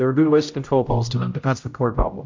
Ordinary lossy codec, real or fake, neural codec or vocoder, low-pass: MP3, 48 kbps; fake; codec, 16 kHz, 0.5 kbps, X-Codec, HuBERT features, trained on general audio; 7.2 kHz